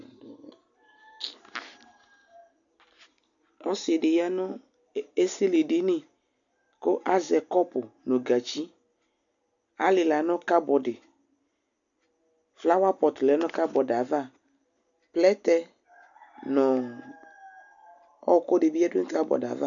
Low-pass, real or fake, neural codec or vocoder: 7.2 kHz; real; none